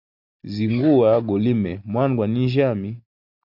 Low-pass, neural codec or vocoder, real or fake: 5.4 kHz; none; real